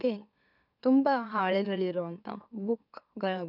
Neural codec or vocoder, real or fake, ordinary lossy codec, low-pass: autoencoder, 44.1 kHz, a latent of 192 numbers a frame, MeloTTS; fake; none; 5.4 kHz